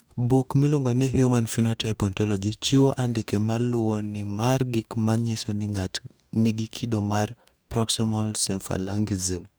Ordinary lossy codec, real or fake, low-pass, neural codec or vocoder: none; fake; none; codec, 44.1 kHz, 2.6 kbps, DAC